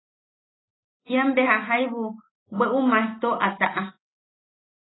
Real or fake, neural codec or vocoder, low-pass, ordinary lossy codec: real; none; 7.2 kHz; AAC, 16 kbps